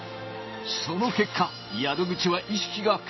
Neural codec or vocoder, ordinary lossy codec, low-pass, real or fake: none; MP3, 24 kbps; 7.2 kHz; real